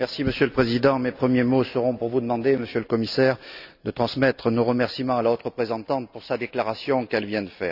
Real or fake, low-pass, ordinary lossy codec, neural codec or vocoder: real; 5.4 kHz; none; none